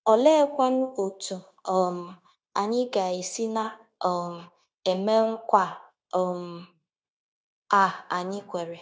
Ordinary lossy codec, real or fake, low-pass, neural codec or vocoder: none; fake; none; codec, 16 kHz, 0.9 kbps, LongCat-Audio-Codec